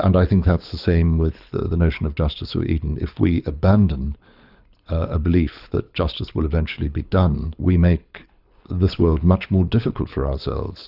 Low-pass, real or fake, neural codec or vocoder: 5.4 kHz; fake; codec, 24 kHz, 6 kbps, HILCodec